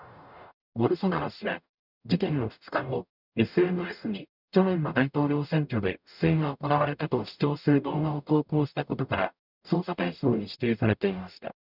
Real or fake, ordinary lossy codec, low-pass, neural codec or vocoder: fake; none; 5.4 kHz; codec, 44.1 kHz, 0.9 kbps, DAC